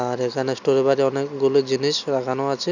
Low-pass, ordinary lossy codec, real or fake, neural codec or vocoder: 7.2 kHz; none; real; none